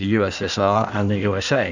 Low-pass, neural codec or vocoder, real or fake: 7.2 kHz; codec, 16 kHz in and 24 kHz out, 1.1 kbps, FireRedTTS-2 codec; fake